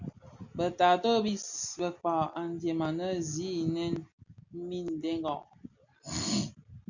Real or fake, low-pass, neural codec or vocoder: real; 7.2 kHz; none